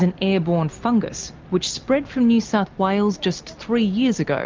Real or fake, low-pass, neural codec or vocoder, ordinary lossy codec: real; 7.2 kHz; none; Opus, 24 kbps